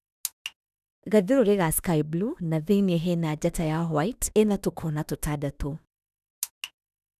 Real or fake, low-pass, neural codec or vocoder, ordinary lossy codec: fake; 14.4 kHz; autoencoder, 48 kHz, 32 numbers a frame, DAC-VAE, trained on Japanese speech; none